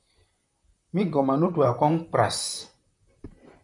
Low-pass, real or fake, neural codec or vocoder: 10.8 kHz; fake; vocoder, 44.1 kHz, 128 mel bands, Pupu-Vocoder